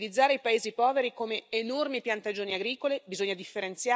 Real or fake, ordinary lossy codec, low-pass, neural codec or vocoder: real; none; none; none